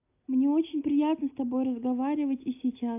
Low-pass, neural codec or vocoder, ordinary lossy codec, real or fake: 3.6 kHz; none; AAC, 24 kbps; real